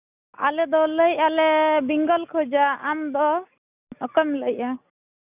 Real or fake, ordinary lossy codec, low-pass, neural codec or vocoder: real; none; 3.6 kHz; none